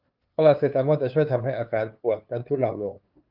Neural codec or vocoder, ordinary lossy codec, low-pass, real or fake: codec, 16 kHz, 2 kbps, FunCodec, trained on LibriTTS, 25 frames a second; Opus, 24 kbps; 5.4 kHz; fake